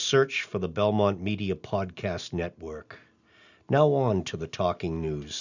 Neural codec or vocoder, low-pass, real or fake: none; 7.2 kHz; real